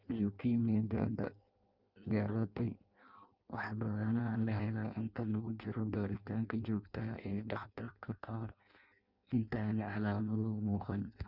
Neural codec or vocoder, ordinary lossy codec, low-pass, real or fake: codec, 16 kHz in and 24 kHz out, 0.6 kbps, FireRedTTS-2 codec; Opus, 32 kbps; 5.4 kHz; fake